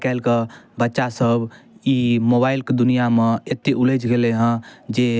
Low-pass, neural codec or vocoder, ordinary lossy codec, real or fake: none; none; none; real